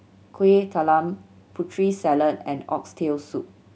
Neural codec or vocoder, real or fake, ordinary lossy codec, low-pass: none; real; none; none